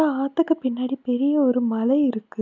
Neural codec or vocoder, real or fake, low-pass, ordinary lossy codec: none; real; 7.2 kHz; none